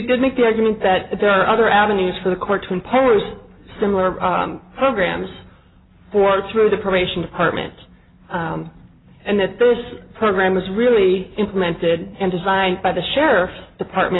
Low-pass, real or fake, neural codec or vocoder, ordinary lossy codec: 7.2 kHz; real; none; AAC, 16 kbps